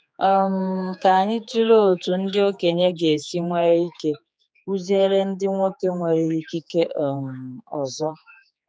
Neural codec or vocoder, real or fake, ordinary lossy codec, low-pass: codec, 16 kHz, 4 kbps, X-Codec, HuBERT features, trained on general audio; fake; none; none